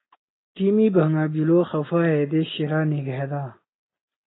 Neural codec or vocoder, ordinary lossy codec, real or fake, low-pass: none; AAC, 16 kbps; real; 7.2 kHz